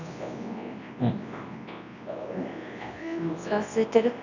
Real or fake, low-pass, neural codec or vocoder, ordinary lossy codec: fake; 7.2 kHz; codec, 24 kHz, 0.9 kbps, WavTokenizer, large speech release; none